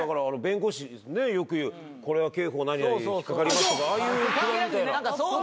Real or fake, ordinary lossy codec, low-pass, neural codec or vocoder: real; none; none; none